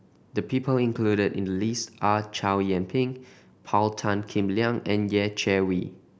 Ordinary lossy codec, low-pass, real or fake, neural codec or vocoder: none; none; real; none